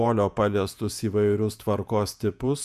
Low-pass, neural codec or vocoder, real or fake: 14.4 kHz; vocoder, 48 kHz, 128 mel bands, Vocos; fake